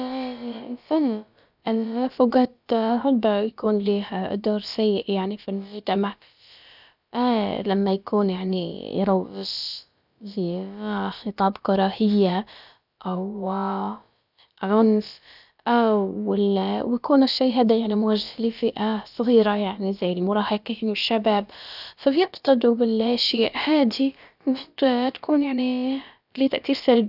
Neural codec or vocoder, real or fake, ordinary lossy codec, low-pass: codec, 16 kHz, about 1 kbps, DyCAST, with the encoder's durations; fake; none; 5.4 kHz